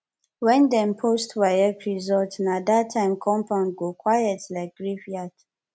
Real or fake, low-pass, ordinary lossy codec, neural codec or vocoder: real; none; none; none